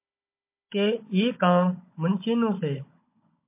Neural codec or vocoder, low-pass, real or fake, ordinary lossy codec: codec, 16 kHz, 16 kbps, FunCodec, trained on Chinese and English, 50 frames a second; 3.6 kHz; fake; MP3, 24 kbps